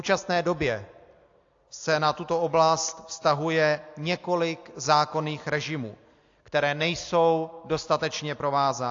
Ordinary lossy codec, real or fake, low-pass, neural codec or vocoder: AAC, 48 kbps; real; 7.2 kHz; none